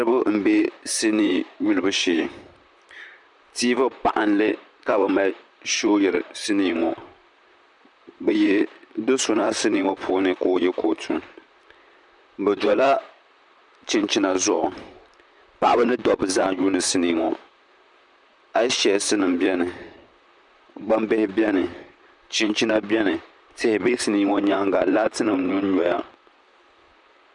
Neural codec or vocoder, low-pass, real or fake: vocoder, 44.1 kHz, 128 mel bands, Pupu-Vocoder; 10.8 kHz; fake